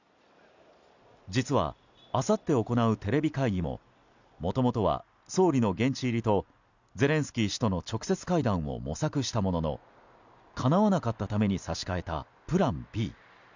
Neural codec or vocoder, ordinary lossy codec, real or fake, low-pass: none; none; real; 7.2 kHz